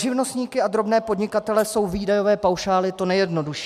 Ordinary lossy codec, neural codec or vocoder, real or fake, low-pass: MP3, 96 kbps; none; real; 14.4 kHz